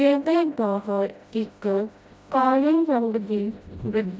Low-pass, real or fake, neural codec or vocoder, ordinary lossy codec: none; fake; codec, 16 kHz, 0.5 kbps, FreqCodec, smaller model; none